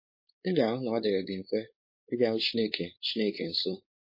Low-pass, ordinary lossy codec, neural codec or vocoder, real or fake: 5.4 kHz; MP3, 24 kbps; codec, 16 kHz, 4.8 kbps, FACodec; fake